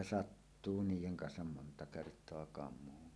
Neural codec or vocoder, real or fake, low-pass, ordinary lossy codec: none; real; none; none